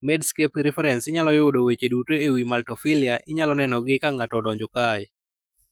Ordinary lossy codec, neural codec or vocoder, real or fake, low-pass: none; codec, 44.1 kHz, 7.8 kbps, DAC; fake; none